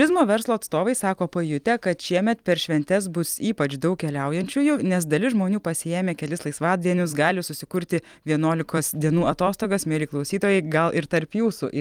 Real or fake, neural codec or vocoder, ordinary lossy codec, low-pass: fake; vocoder, 44.1 kHz, 128 mel bands every 256 samples, BigVGAN v2; Opus, 32 kbps; 19.8 kHz